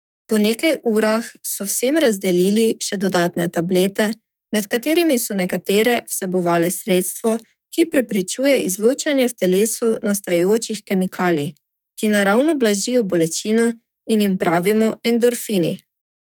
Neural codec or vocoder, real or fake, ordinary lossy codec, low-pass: codec, 44.1 kHz, 2.6 kbps, SNAC; fake; none; none